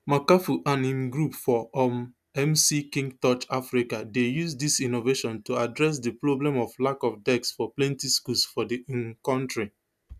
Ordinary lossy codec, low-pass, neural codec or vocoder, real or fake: none; 14.4 kHz; none; real